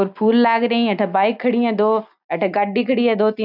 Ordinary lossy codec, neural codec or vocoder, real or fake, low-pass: none; none; real; 5.4 kHz